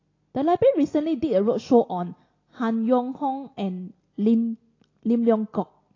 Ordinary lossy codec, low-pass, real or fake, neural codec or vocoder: AAC, 32 kbps; 7.2 kHz; real; none